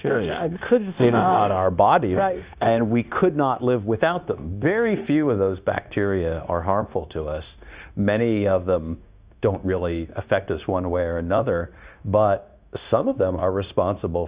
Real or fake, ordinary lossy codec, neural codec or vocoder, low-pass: fake; Opus, 64 kbps; codec, 16 kHz, 0.9 kbps, LongCat-Audio-Codec; 3.6 kHz